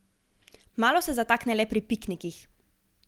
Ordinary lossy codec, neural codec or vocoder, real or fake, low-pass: Opus, 24 kbps; none; real; 19.8 kHz